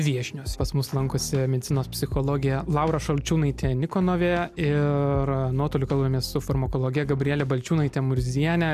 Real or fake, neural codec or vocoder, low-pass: real; none; 14.4 kHz